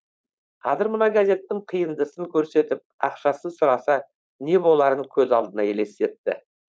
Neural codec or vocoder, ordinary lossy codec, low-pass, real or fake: codec, 16 kHz, 4.8 kbps, FACodec; none; none; fake